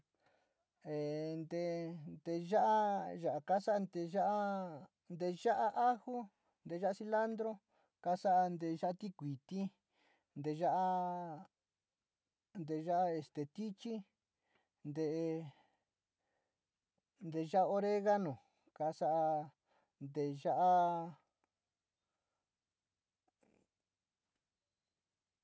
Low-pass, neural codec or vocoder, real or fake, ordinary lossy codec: none; none; real; none